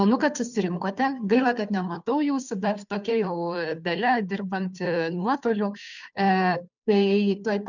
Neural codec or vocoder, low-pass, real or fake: codec, 16 kHz, 2 kbps, FunCodec, trained on Chinese and English, 25 frames a second; 7.2 kHz; fake